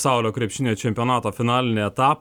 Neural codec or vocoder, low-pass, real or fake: none; 19.8 kHz; real